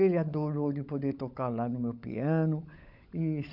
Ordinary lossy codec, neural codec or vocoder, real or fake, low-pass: none; codec, 16 kHz, 16 kbps, FunCodec, trained on LibriTTS, 50 frames a second; fake; 5.4 kHz